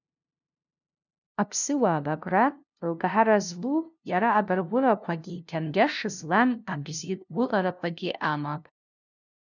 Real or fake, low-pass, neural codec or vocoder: fake; 7.2 kHz; codec, 16 kHz, 0.5 kbps, FunCodec, trained on LibriTTS, 25 frames a second